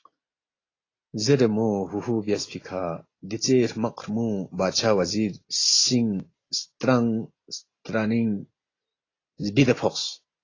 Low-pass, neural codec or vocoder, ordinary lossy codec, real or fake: 7.2 kHz; none; AAC, 32 kbps; real